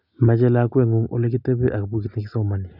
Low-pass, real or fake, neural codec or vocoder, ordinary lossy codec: 5.4 kHz; real; none; none